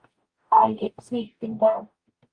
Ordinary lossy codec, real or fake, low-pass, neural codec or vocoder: Opus, 32 kbps; fake; 9.9 kHz; codec, 44.1 kHz, 0.9 kbps, DAC